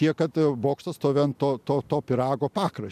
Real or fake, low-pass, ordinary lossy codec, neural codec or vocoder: real; 14.4 kHz; MP3, 96 kbps; none